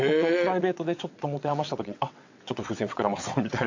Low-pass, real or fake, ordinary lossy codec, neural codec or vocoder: 7.2 kHz; fake; AAC, 48 kbps; vocoder, 22.05 kHz, 80 mel bands, WaveNeXt